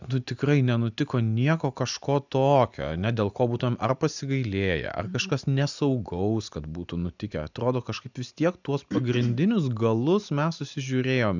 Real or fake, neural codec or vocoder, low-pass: real; none; 7.2 kHz